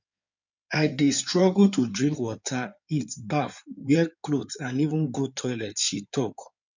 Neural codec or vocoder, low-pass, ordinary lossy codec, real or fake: codec, 16 kHz in and 24 kHz out, 2.2 kbps, FireRedTTS-2 codec; 7.2 kHz; none; fake